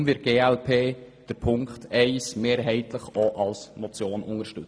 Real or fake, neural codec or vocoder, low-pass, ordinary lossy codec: real; none; none; none